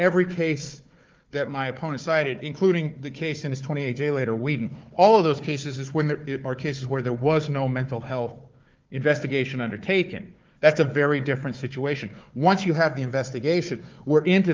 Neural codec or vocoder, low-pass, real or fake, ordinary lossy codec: codec, 16 kHz, 4 kbps, FunCodec, trained on Chinese and English, 50 frames a second; 7.2 kHz; fake; Opus, 24 kbps